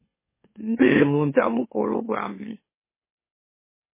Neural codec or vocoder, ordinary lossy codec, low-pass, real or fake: autoencoder, 44.1 kHz, a latent of 192 numbers a frame, MeloTTS; MP3, 16 kbps; 3.6 kHz; fake